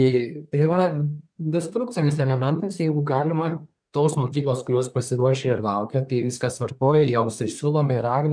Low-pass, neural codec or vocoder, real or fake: 9.9 kHz; codec, 24 kHz, 1 kbps, SNAC; fake